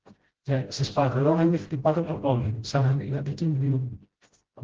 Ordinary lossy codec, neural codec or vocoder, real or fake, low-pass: Opus, 16 kbps; codec, 16 kHz, 0.5 kbps, FreqCodec, smaller model; fake; 7.2 kHz